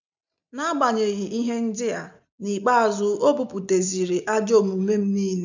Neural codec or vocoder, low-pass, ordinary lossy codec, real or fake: none; 7.2 kHz; none; real